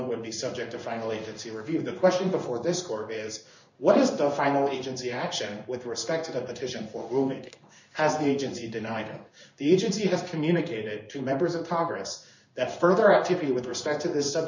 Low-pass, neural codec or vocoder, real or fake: 7.2 kHz; codec, 16 kHz in and 24 kHz out, 1 kbps, XY-Tokenizer; fake